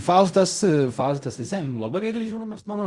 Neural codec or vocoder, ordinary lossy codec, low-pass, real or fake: codec, 16 kHz in and 24 kHz out, 0.4 kbps, LongCat-Audio-Codec, fine tuned four codebook decoder; Opus, 64 kbps; 10.8 kHz; fake